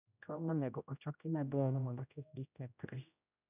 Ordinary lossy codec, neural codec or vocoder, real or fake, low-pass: none; codec, 16 kHz, 0.5 kbps, X-Codec, HuBERT features, trained on general audio; fake; 3.6 kHz